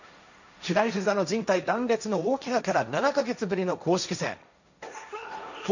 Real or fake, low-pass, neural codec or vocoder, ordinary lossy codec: fake; 7.2 kHz; codec, 16 kHz, 1.1 kbps, Voila-Tokenizer; none